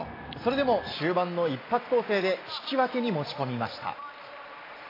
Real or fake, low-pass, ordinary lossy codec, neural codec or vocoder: real; 5.4 kHz; AAC, 24 kbps; none